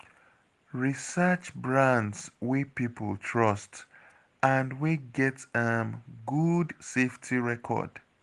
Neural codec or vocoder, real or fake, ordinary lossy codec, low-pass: none; real; Opus, 24 kbps; 10.8 kHz